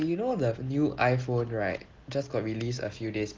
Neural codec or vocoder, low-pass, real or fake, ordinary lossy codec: none; 7.2 kHz; real; Opus, 16 kbps